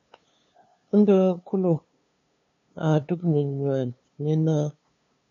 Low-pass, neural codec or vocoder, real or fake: 7.2 kHz; codec, 16 kHz, 2 kbps, FunCodec, trained on LibriTTS, 25 frames a second; fake